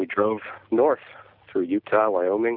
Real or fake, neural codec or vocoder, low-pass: real; none; 5.4 kHz